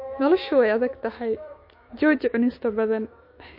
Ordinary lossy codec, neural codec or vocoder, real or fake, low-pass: MP3, 32 kbps; autoencoder, 48 kHz, 128 numbers a frame, DAC-VAE, trained on Japanese speech; fake; 5.4 kHz